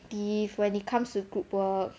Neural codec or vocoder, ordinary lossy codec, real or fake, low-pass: none; none; real; none